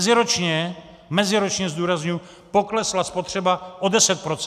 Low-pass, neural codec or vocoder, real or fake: 14.4 kHz; none; real